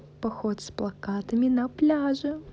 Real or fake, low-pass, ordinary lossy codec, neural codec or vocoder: real; none; none; none